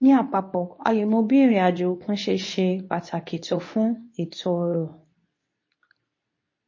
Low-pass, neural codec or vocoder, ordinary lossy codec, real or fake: 7.2 kHz; codec, 24 kHz, 0.9 kbps, WavTokenizer, medium speech release version 2; MP3, 32 kbps; fake